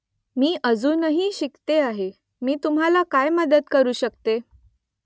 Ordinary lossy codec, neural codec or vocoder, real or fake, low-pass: none; none; real; none